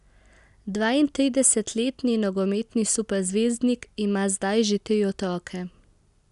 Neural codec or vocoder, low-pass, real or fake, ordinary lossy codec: none; 10.8 kHz; real; none